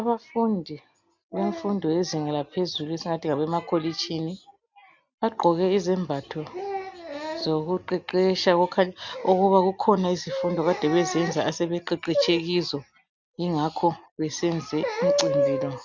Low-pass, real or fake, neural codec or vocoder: 7.2 kHz; real; none